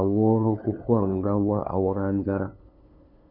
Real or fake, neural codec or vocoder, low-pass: fake; codec, 16 kHz, 2 kbps, FunCodec, trained on LibriTTS, 25 frames a second; 5.4 kHz